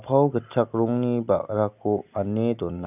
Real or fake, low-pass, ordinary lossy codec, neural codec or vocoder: real; 3.6 kHz; none; none